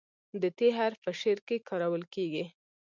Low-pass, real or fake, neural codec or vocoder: 7.2 kHz; real; none